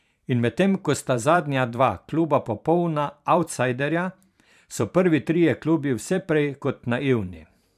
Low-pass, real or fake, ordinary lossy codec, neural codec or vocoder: 14.4 kHz; fake; none; vocoder, 44.1 kHz, 128 mel bands every 512 samples, BigVGAN v2